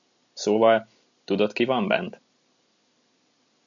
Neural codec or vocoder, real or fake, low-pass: none; real; 7.2 kHz